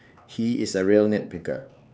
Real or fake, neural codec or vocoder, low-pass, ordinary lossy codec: fake; codec, 16 kHz, 4 kbps, X-Codec, HuBERT features, trained on LibriSpeech; none; none